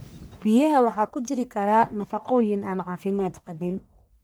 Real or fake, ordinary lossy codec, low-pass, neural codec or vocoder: fake; none; none; codec, 44.1 kHz, 1.7 kbps, Pupu-Codec